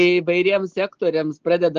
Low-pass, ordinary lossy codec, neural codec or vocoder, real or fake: 7.2 kHz; Opus, 16 kbps; none; real